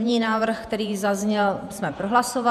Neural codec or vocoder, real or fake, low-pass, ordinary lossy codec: vocoder, 48 kHz, 128 mel bands, Vocos; fake; 14.4 kHz; MP3, 96 kbps